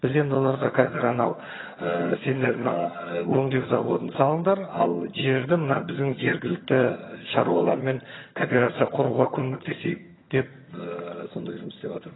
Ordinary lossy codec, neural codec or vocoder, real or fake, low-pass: AAC, 16 kbps; vocoder, 22.05 kHz, 80 mel bands, HiFi-GAN; fake; 7.2 kHz